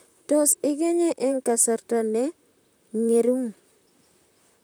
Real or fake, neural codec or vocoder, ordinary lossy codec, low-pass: fake; vocoder, 44.1 kHz, 128 mel bands, Pupu-Vocoder; none; none